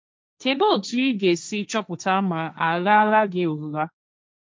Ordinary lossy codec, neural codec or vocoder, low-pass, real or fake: none; codec, 16 kHz, 1.1 kbps, Voila-Tokenizer; none; fake